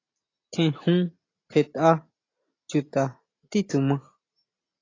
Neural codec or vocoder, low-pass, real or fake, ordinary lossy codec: none; 7.2 kHz; real; AAC, 32 kbps